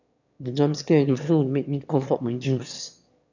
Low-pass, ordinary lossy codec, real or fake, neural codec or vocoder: 7.2 kHz; none; fake; autoencoder, 22.05 kHz, a latent of 192 numbers a frame, VITS, trained on one speaker